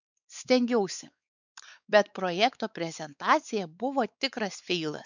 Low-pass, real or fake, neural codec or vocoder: 7.2 kHz; fake; codec, 16 kHz, 4 kbps, X-Codec, WavLM features, trained on Multilingual LibriSpeech